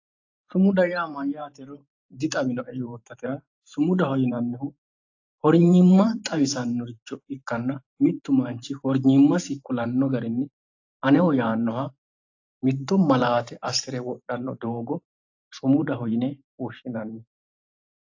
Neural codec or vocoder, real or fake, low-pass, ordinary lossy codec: vocoder, 44.1 kHz, 128 mel bands every 512 samples, BigVGAN v2; fake; 7.2 kHz; AAC, 48 kbps